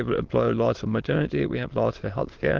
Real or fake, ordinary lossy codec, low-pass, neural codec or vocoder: fake; Opus, 16 kbps; 7.2 kHz; autoencoder, 22.05 kHz, a latent of 192 numbers a frame, VITS, trained on many speakers